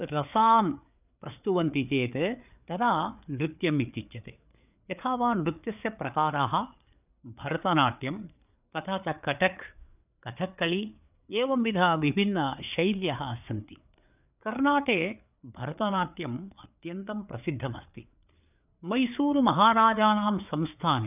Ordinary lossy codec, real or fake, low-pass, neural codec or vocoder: none; fake; 3.6 kHz; codec, 16 kHz, 4 kbps, FreqCodec, larger model